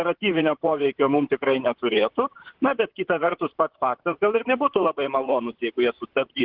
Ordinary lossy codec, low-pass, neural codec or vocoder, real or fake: Opus, 16 kbps; 5.4 kHz; vocoder, 44.1 kHz, 128 mel bands, Pupu-Vocoder; fake